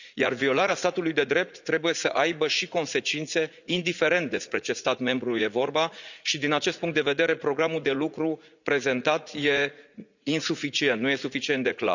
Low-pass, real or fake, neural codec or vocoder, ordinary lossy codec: 7.2 kHz; fake; vocoder, 44.1 kHz, 80 mel bands, Vocos; none